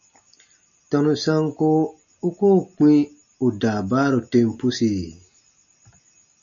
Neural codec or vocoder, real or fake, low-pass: none; real; 7.2 kHz